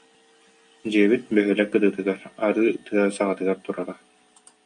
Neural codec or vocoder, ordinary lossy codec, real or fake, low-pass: none; MP3, 48 kbps; real; 9.9 kHz